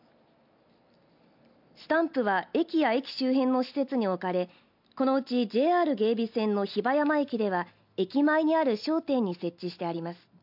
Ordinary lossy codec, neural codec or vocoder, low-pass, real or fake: MP3, 48 kbps; none; 5.4 kHz; real